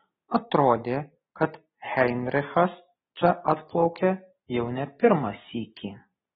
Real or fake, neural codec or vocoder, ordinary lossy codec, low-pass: real; none; AAC, 16 kbps; 7.2 kHz